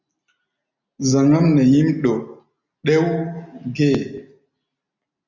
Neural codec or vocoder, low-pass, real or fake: none; 7.2 kHz; real